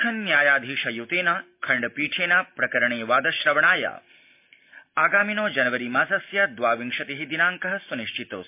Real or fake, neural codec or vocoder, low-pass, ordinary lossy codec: real; none; 3.6 kHz; MP3, 32 kbps